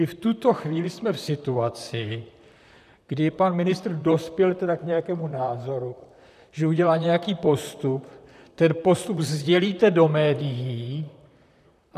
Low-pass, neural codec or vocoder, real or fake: 14.4 kHz; vocoder, 44.1 kHz, 128 mel bands, Pupu-Vocoder; fake